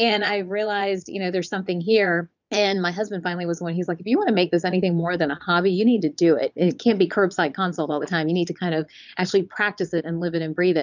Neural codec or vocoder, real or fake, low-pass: vocoder, 22.05 kHz, 80 mel bands, Vocos; fake; 7.2 kHz